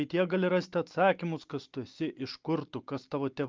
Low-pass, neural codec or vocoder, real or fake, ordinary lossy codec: 7.2 kHz; none; real; Opus, 24 kbps